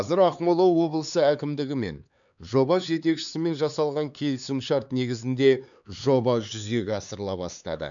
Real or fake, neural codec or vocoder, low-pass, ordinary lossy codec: fake; codec, 16 kHz, 4 kbps, X-Codec, HuBERT features, trained on LibriSpeech; 7.2 kHz; none